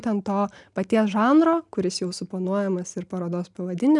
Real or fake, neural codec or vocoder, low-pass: real; none; 10.8 kHz